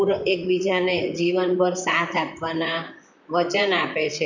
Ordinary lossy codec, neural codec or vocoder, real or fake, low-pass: none; vocoder, 44.1 kHz, 128 mel bands, Pupu-Vocoder; fake; 7.2 kHz